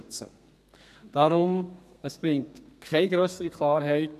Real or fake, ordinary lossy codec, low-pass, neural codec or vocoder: fake; none; 14.4 kHz; codec, 32 kHz, 1.9 kbps, SNAC